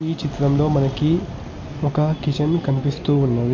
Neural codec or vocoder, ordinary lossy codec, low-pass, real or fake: none; MP3, 48 kbps; 7.2 kHz; real